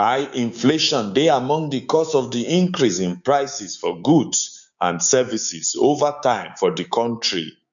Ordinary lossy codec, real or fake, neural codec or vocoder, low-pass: none; fake; codec, 16 kHz, 6 kbps, DAC; 7.2 kHz